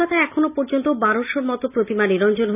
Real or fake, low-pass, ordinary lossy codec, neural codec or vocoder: real; 3.6 kHz; none; none